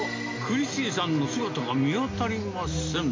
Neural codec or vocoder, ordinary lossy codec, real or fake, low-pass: none; MP3, 48 kbps; real; 7.2 kHz